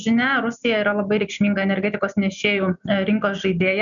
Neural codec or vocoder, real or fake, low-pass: none; real; 7.2 kHz